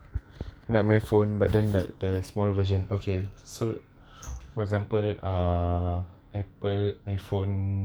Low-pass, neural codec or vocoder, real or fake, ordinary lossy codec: none; codec, 44.1 kHz, 2.6 kbps, SNAC; fake; none